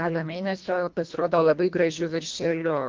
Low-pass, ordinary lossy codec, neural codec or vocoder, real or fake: 7.2 kHz; Opus, 24 kbps; codec, 24 kHz, 1.5 kbps, HILCodec; fake